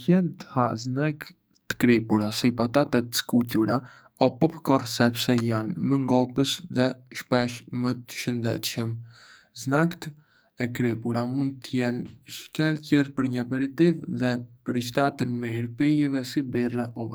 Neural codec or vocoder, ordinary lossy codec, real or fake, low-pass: codec, 44.1 kHz, 2.6 kbps, SNAC; none; fake; none